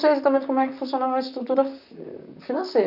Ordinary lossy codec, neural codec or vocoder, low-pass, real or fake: none; vocoder, 44.1 kHz, 128 mel bands, Pupu-Vocoder; 5.4 kHz; fake